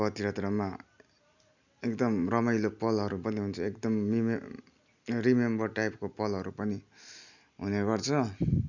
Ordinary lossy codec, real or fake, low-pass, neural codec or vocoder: none; real; 7.2 kHz; none